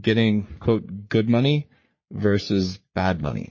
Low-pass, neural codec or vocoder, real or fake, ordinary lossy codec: 7.2 kHz; codec, 44.1 kHz, 3.4 kbps, Pupu-Codec; fake; MP3, 32 kbps